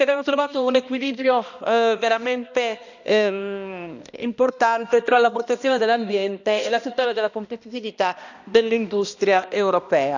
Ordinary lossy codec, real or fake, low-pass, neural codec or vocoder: none; fake; 7.2 kHz; codec, 16 kHz, 1 kbps, X-Codec, HuBERT features, trained on balanced general audio